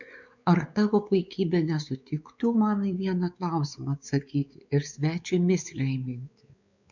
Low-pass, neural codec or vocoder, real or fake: 7.2 kHz; codec, 16 kHz, 4 kbps, X-Codec, WavLM features, trained on Multilingual LibriSpeech; fake